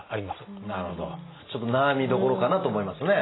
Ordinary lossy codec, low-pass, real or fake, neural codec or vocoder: AAC, 16 kbps; 7.2 kHz; real; none